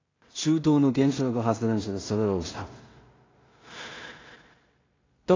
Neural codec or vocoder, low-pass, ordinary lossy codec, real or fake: codec, 16 kHz in and 24 kHz out, 0.4 kbps, LongCat-Audio-Codec, two codebook decoder; 7.2 kHz; AAC, 32 kbps; fake